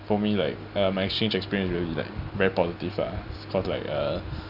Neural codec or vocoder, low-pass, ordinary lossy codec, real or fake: none; 5.4 kHz; none; real